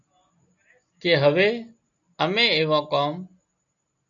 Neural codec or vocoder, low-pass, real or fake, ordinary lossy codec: none; 7.2 kHz; real; MP3, 96 kbps